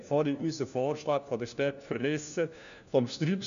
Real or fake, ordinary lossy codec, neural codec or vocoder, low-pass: fake; none; codec, 16 kHz, 1 kbps, FunCodec, trained on LibriTTS, 50 frames a second; 7.2 kHz